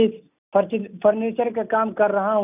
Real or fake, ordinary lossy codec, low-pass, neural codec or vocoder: real; none; 3.6 kHz; none